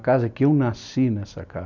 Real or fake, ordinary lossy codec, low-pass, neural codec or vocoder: real; none; 7.2 kHz; none